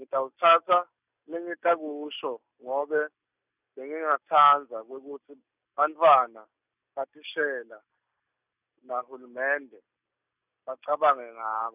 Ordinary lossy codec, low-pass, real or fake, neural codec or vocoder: none; 3.6 kHz; real; none